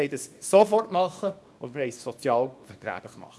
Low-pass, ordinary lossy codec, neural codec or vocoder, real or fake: none; none; codec, 24 kHz, 0.9 kbps, WavTokenizer, small release; fake